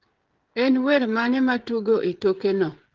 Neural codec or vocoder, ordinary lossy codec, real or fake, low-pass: codec, 16 kHz, 16 kbps, FreqCodec, smaller model; Opus, 16 kbps; fake; 7.2 kHz